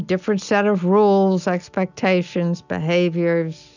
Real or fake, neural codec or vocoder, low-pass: real; none; 7.2 kHz